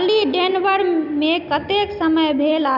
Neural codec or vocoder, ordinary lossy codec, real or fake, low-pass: none; none; real; 5.4 kHz